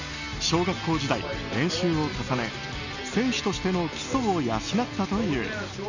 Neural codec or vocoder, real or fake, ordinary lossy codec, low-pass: none; real; none; 7.2 kHz